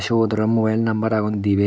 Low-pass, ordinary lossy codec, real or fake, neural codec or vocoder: none; none; real; none